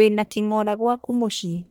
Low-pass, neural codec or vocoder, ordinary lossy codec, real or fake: none; codec, 44.1 kHz, 1.7 kbps, Pupu-Codec; none; fake